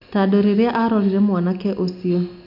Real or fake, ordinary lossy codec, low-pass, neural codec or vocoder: real; none; 5.4 kHz; none